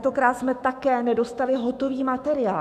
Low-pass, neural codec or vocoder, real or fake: 14.4 kHz; autoencoder, 48 kHz, 128 numbers a frame, DAC-VAE, trained on Japanese speech; fake